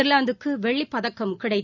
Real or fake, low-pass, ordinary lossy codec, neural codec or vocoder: real; 7.2 kHz; none; none